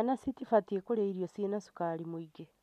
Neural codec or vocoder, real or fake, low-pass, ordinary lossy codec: none; real; 14.4 kHz; MP3, 96 kbps